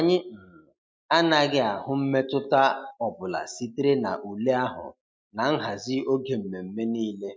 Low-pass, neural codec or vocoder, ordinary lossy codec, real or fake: none; none; none; real